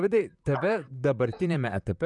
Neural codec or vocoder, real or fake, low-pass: vocoder, 44.1 kHz, 128 mel bands, Pupu-Vocoder; fake; 10.8 kHz